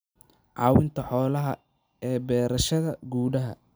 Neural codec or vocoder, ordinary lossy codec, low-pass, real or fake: none; none; none; real